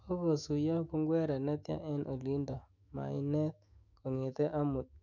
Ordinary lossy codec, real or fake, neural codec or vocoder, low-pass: none; fake; autoencoder, 48 kHz, 128 numbers a frame, DAC-VAE, trained on Japanese speech; 7.2 kHz